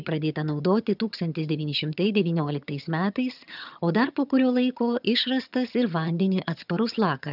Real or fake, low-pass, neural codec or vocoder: fake; 5.4 kHz; vocoder, 22.05 kHz, 80 mel bands, HiFi-GAN